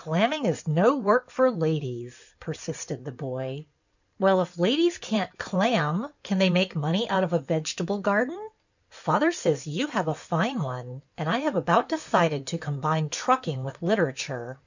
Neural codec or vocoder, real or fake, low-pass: codec, 16 kHz in and 24 kHz out, 2.2 kbps, FireRedTTS-2 codec; fake; 7.2 kHz